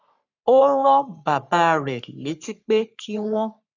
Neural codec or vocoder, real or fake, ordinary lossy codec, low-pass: codec, 44.1 kHz, 3.4 kbps, Pupu-Codec; fake; none; 7.2 kHz